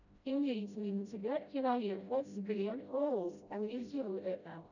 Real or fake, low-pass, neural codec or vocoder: fake; 7.2 kHz; codec, 16 kHz, 0.5 kbps, FreqCodec, smaller model